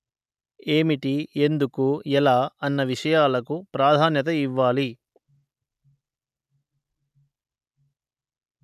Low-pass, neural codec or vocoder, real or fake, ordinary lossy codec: 14.4 kHz; none; real; none